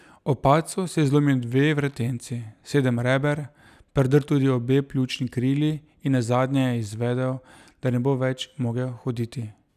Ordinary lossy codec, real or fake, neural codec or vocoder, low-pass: none; real; none; 14.4 kHz